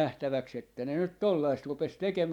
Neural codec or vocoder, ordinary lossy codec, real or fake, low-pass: vocoder, 44.1 kHz, 128 mel bands every 512 samples, BigVGAN v2; none; fake; 19.8 kHz